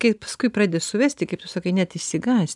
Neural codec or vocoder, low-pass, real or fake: vocoder, 44.1 kHz, 128 mel bands every 256 samples, BigVGAN v2; 10.8 kHz; fake